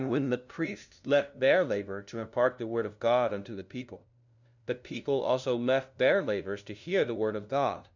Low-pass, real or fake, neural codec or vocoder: 7.2 kHz; fake; codec, 16 kHz, 0.5 kbps, FunCodec, trained on LibriTTS, 25 frames a second